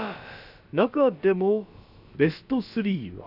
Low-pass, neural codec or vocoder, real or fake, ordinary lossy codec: 5.4 kHz; codec, 16 kHz, about 1 kbps, DyCAST, with the encoder's durations; fake; none